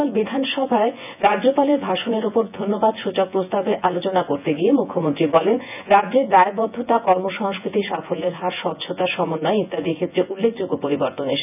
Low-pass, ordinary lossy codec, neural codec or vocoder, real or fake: 3.6 kHz; none; vocoder, 24 kHz, 100 mel bands, Vocos; fake